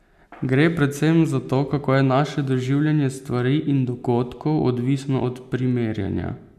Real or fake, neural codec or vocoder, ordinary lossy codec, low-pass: real; none; none; 14.4 kHz